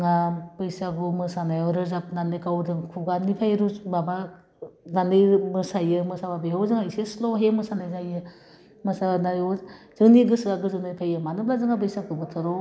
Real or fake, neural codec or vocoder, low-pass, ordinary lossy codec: real; none; none; none